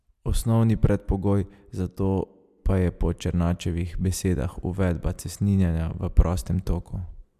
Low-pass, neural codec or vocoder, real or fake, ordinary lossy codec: 14.4 kHz; none; real; MP3, 96 kbps